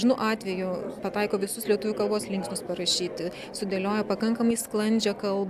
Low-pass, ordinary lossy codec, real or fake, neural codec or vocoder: 14.4 kHz; AAC, 96 kbps; real; none